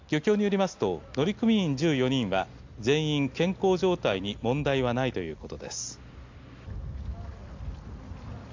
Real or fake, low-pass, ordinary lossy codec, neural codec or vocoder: real; 7.2 kHz; AAC, 48 kbps; none